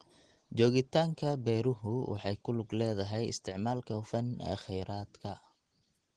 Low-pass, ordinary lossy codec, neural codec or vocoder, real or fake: 9.9 kHz; Opus, 16 kbps; none; real